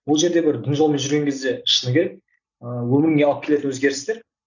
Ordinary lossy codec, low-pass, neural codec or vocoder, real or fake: none; 7.2 kHz; none; real